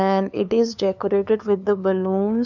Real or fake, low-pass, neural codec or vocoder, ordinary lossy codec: fake; 7.2 kHz; codec, 16 kHz, 2 kbps, FunCodec, trained on LibriTTS, 25 frames a second; none